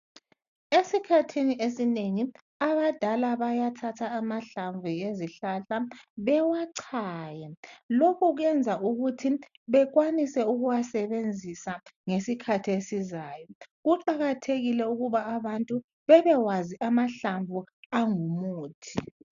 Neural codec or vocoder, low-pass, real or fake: none; 7.2 kHz; real